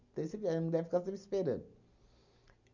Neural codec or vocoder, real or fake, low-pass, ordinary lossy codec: none; real; 7.2 kHz; none